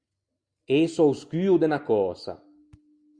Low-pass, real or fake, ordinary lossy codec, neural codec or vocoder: 9.9 kHz; real; Opus, 64 kbps; none